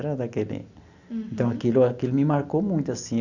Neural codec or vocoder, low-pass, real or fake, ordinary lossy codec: none; 7.2 kHz; real; Opus, 64 kbps